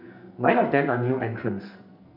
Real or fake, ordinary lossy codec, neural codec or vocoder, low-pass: fake; none; codec, 44.1 kHz, 2.6 kbps, SNAC; 5.4 kHz